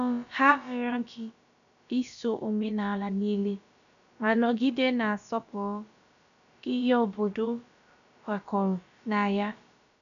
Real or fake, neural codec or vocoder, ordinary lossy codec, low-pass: fake; codec, 16 kHz, about 1 kbps, DyCAST, with the encoder's durations; none; 7.2 kHz